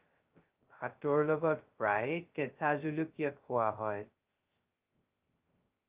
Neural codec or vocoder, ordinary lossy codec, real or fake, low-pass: codec, 16 kHz, 0.2 kbps, FocalCodec; Opus, 32 kbps; fake; 3.6 kHz